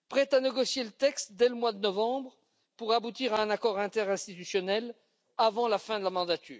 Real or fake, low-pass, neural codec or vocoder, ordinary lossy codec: real; none; none; none